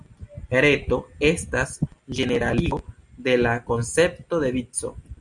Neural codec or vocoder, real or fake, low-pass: none; real; 10.8 kHz